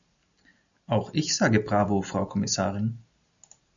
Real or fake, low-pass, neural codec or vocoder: real; 7.2 kHz; none